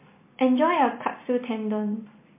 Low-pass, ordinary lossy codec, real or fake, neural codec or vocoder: 3.6 kHz; MP3, 24 kbps; real; none